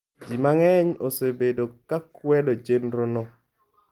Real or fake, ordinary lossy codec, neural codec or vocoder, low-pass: real; Opus, 32 kbps; none; 19.8 kHz